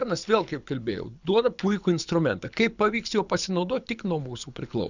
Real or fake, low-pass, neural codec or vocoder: fake; 7.2 kHz; codec, 24 kHz, 6 kbps, HILCodec